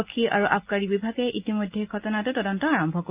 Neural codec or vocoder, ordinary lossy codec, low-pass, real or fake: none; Opus, 24 kbps; 3.6 kHz; real